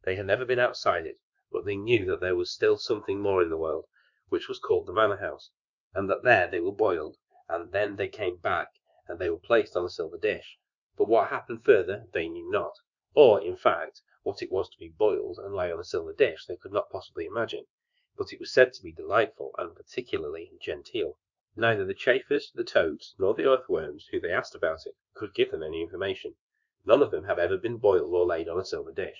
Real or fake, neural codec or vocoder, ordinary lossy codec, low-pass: fake; codec, 24 kHz, 1.2 kbps, DualCodec; Opus, 64 kbps; 7.2 kHz